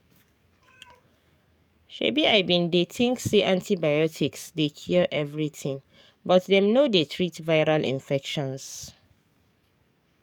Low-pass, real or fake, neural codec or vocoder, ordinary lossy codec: 19.8 kHz; fake; codec, 44.1 kHz, 7.8 kbps, DAC; none